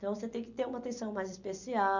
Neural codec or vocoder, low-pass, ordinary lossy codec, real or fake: none; 7.2 kHz; none; real